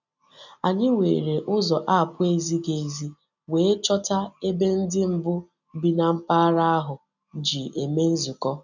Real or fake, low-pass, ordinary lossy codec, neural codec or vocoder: real; 7.2 kHz; none; none